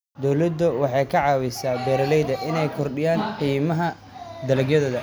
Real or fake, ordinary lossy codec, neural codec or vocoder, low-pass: real; none; none; none